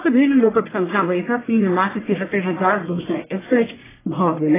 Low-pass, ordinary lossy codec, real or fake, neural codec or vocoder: 3.6 kHz; AAC, 16 kbps; fake; codec, 44.1 kHz, 1.7 kbps, Pupu-Codec